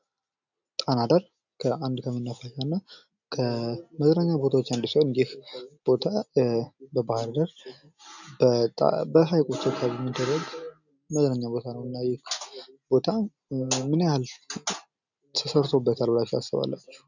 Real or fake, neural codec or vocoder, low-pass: real; none; 7.2 kHz